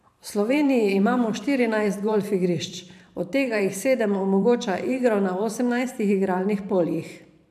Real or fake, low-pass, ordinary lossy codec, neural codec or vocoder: fake; 14.4 kHz; none; vocoder, 44.1 kHz, 128 mel bands every 512 samples, BigVGAN v2